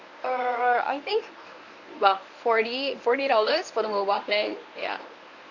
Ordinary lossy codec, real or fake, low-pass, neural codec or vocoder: none; fake; 7.2 kHz; codec, 24 kHz, 0.9 kbps, WavTokenizer, medium speech release version 1